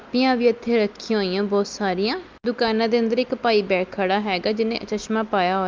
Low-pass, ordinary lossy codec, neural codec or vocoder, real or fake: 7.2 kHz; Opus, 24 kbps; none; real